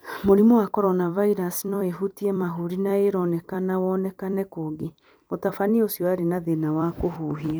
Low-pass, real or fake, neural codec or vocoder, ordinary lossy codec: none; fake; vocoder, 44.1 kHz, 128 mel bands every 256 samples, BigVGAN v2; none